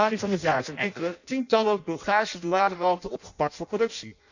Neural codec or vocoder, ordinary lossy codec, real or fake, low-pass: codec, 16 kHz in and 24 kHz out, 0.6 kbps, FireRedTTS-2 codec; none; fake; 7.2 kHz